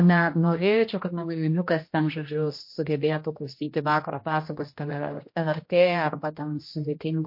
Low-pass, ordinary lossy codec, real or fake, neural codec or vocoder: 5.4 kHz; MP3, 32 kbps; fake; codec, 16 kHz, 1 kbps, X-Codec, HuBERT features, trained on general audio